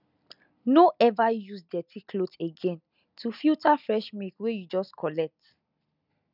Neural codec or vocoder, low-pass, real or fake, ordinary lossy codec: none; 5.4 kHz; real; none